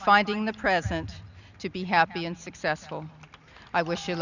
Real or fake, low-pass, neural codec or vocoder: real; 7.2 kHz; none